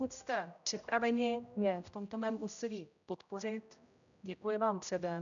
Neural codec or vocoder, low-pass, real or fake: codec, 16 kHz, 0.5 kbps, X-Codec, HuBERT features, trained on general audio; 7.2 kHz; fake